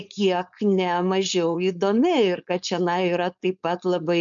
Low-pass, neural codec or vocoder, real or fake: 7.2 kHz; codec, 16 kHz, 4.8 kbps, FACodec; fake